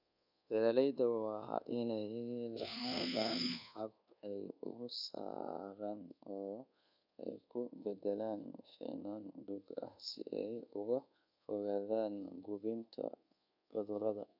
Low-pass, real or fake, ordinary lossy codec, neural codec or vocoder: 5.4 kHz; fake; none; codec, 24 kHz, 1.2 kbps, DualCodec